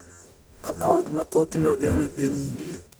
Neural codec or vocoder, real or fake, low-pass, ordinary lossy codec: codec, 44.1 kHz, 0.9 kbps, DAC; fake; none; none